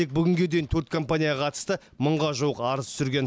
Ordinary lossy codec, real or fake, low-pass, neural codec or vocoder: none; real; none; none